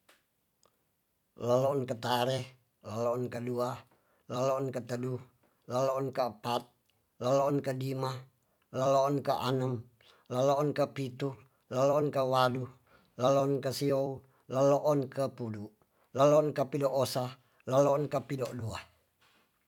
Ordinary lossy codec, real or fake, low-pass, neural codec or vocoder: none; fake; 19.8 kHz; vocoder, 44.1 kHz, 128 mel bands every 256 samples, BigVGAN v2